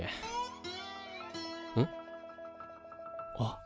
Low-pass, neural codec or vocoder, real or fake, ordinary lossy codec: none; none; real; none